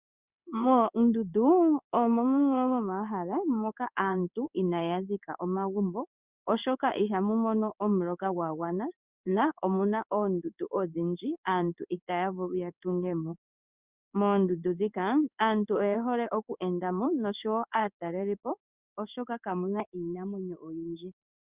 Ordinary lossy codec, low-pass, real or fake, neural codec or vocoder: Opus, 64 kbps; 3.6 kHz; fake; codec, 16 kHz in and 24 kHz out, 1 kbps, XY-Tokenizer